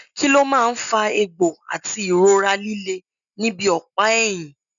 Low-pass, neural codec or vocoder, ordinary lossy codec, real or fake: 7.2 kHz; none; none; real